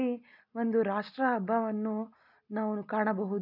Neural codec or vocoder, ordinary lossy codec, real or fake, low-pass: none; none; real; 5.4 kHz